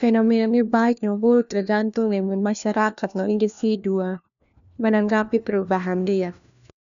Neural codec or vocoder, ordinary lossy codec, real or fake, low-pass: codec, 16 kHz, 1 kbps, FunCodec, trained on LibriTTS, 50 frames a second; none; fake; 7.2 kHz